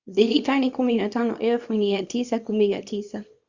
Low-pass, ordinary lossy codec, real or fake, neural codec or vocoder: 7.2 kHz; Opus, 64 kbps; fake; codec, 24 kHz, 0.9 kbps, WavTokenizer, small release